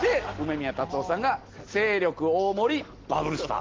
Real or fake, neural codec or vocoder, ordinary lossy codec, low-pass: real; none; Opus, 16 kbps; 7.2 kHz